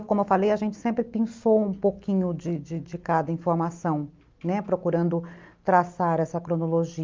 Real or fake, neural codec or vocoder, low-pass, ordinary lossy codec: real; none; 7.2 kHz; Opus, 32 kbps